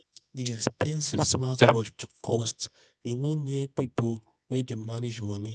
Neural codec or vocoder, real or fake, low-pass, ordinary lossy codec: codec, 24 kHz, 0.9 kbps, WavTokenizer, medium music audio release; fake; none; none